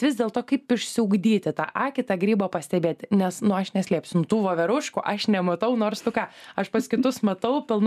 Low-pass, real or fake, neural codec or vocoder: 14.4 kHz; real; none